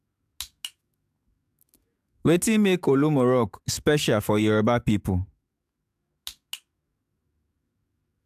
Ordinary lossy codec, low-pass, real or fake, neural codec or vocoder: AAC, 96 kbps; 14.4 kHz; fake; codec, 44.1 kHz, 7.8 kbps, DAC